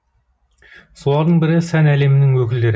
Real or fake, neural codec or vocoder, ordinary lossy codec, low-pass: real; none; none; none